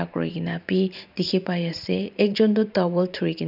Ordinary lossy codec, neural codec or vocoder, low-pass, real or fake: none; vocoder, 44.1 kHz, 128 mel bands every 512 samples, BigVGAN v2; 5.4 kHz; fake